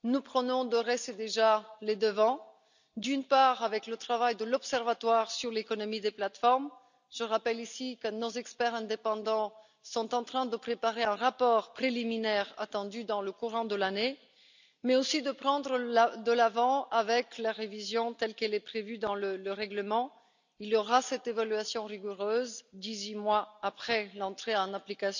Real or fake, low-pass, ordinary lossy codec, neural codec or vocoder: real; 7.2 kHz; none; none